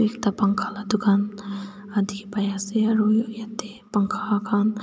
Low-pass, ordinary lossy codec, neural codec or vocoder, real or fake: none; none; none; real